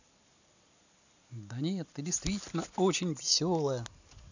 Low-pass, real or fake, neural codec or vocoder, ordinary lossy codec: 7.2 kHz; real; none; none